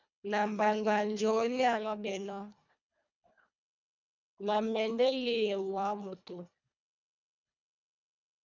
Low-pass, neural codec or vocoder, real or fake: 7.2 kHz; codec, 24 kHz, 1.5 kbps, HILCodec; fake